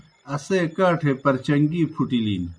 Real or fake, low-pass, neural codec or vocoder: real; 9.9 kHz; none